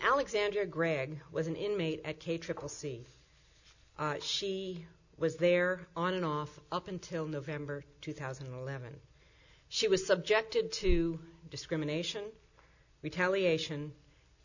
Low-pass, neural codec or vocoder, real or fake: 7.2 kHz; none; real